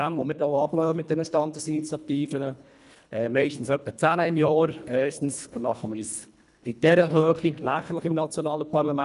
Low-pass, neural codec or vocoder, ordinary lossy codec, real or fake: 10.8 kHz; codec, 24 kHz, 1.5 kbps, HILCodec; none; fake